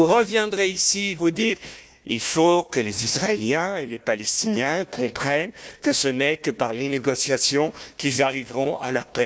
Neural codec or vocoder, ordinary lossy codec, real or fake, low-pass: codec, 16 kHz, 1 kbps, FunCodec, trained on Chinese and English, 50 frames a second; none; fake; none